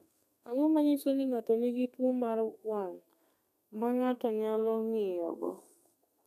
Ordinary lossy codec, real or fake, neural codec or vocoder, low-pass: none; fake; codec, 32 kHz, 1.9 kbps, SNAC; 14.4 kHz